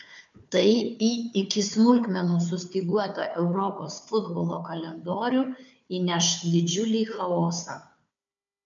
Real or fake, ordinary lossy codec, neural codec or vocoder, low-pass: fake; MP3, 64 kbps; codec, 16 kHz, 4 kbps, FunCodec, trained on Chinese and English, 50 frames a second; 7.2 kHz